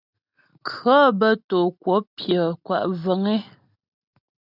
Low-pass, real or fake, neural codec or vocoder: 5.4 kHz; real; none